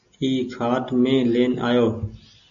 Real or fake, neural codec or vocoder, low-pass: real; none; 7.2 kHz